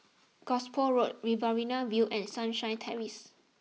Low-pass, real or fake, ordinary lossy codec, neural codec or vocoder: none; real; none; none